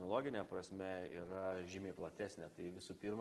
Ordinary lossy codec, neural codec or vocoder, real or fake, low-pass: Opus, 16 kbps; none; real; 14.4 kHz